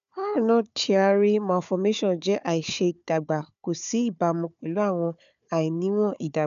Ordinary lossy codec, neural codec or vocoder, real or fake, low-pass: none; codec, 16 kHz, 4 kbps, FunCodec, trained on Chinese and English, 50 frames a second; fake; 7.2 kHz